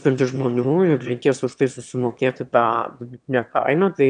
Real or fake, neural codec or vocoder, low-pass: fake; autoencoder, 22.05 kHz, a latent of 192 numbers a frame, VITS, trained on one speaker; 9.9 kHz